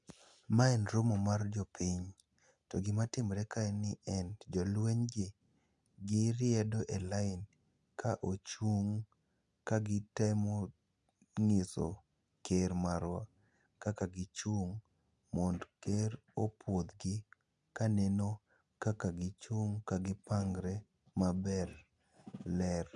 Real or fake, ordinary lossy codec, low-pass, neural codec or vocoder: real; none; 10.8 kHz; none